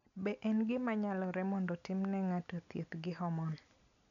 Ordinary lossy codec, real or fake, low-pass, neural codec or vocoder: none; real; 7.2 kHz; none